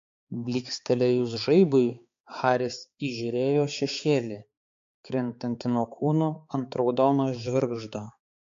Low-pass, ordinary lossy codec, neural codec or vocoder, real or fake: 7.2 kHz; MP3, 48 kbps; codec, 16 kHz, 4 kbps, X-Codec, HuBERT features, trained on balanced general audio; fake